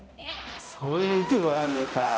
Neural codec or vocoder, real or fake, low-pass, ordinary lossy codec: codec, 16 kHz, 0.5 kbps, X-Codec, HuBERT features, trained on balanced general audio; fake; none; none